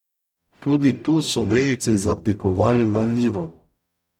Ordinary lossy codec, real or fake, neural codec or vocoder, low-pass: none; fake; codec, 44.1 kHz, 0.9 kbps, DAC; 19.8 kHz